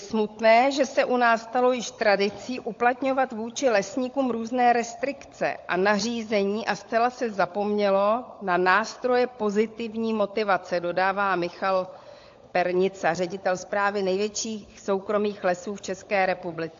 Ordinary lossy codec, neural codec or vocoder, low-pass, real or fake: AAC, 48 kbps; codec, 16 kHz, 16 kbps, FunCodec, trained on LibriTTS, 50 frames a second; 7.2 kHz; fake